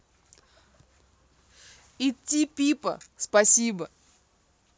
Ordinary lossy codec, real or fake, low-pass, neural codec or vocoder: none; real; none; none